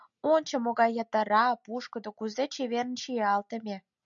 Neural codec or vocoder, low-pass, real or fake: none; 7.2 kHz; real